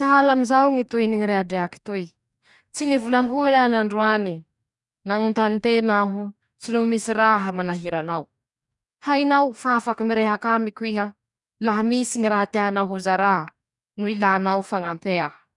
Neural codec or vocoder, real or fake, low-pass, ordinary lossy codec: codec, 44.1 kHz, 2.6 kbps, DAC; fake; 10.8 kHz; none